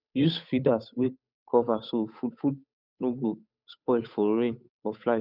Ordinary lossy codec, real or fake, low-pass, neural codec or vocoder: none; fake; 5.4 kHz; codec, 16 kHz, 8 kbps, FunCodec, trained on Chinese and English, 25 frames a second